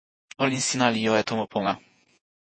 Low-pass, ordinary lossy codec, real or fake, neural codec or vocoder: 9.9 kHz; MP3, 32 kbps; fake; vocoder, 48 kHz, 128 mel bands, Vocos